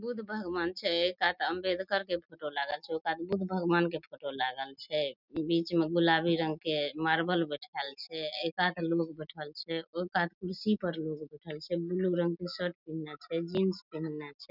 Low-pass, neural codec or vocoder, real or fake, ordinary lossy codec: 5.4 kHz; none; real; none